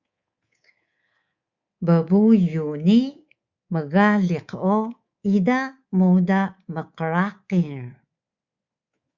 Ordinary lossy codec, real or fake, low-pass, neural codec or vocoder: Opus, 64 kbps; fake; 7.2 kHz; codec, 24 kHz, 3.1 kbps, DualCodec